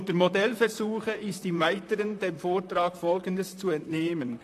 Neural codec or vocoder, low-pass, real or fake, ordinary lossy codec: vocoder, 44.1 kHz, 128 mel bands, Pupu-Vocoder; 14.4 kHz; fake; AAC, 64 kbps